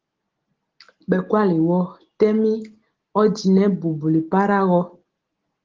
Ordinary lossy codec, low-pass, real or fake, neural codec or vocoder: Opus, 16 kbps; 7.2 kHz; real; none